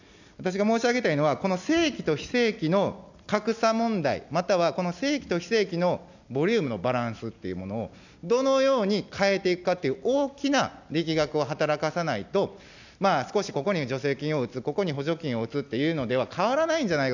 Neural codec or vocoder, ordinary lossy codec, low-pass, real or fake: none; none; 7.2 kHz; real